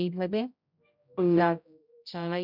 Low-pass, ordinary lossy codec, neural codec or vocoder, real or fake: 5.4 kHz; none; codec, 16 kHz, 0.5 kbps, X-Codec, HuBERT features, trained on general audio; fake